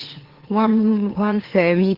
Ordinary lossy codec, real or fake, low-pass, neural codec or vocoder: Opus, 16 kbps; fake; 5.4 kHz; autoencoder, 44.1 kHz, a latent of 192 numbers a frame, MeloTTS